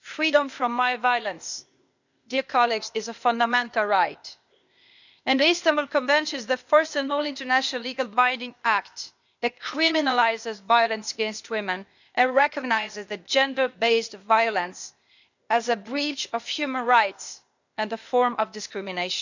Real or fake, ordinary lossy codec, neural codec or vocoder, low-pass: fake; none; codec, 16 kHz, 0.8 kbps, ZipCodec; 7.2 kHz